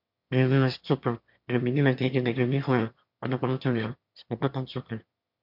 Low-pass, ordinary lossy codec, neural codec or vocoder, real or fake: 5.4 kHz; MP3, 48 kbps; autoencoder, 22.05 kHz, a latent of 192 numbers a frame, VITS, trained on one speaker; fake